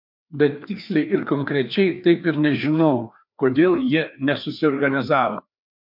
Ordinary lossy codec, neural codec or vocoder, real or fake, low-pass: MP3, 48 kbps; codec, 16 kHz, 2 kbps, FreqCodec, larger model; fake; 5.4 kHz